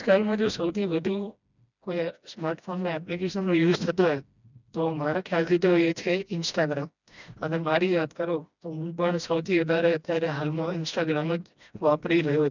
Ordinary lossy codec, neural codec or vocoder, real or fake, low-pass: none; codec, 16 kHz, 1 kbps, FreqCodec, smaller model; fake; 7.2 kHz